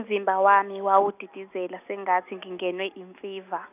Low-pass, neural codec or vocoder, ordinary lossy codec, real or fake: 3.6 kHz; none; none; real